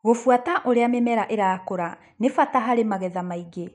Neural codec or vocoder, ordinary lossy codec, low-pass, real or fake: none; none; 10.8 kHz; real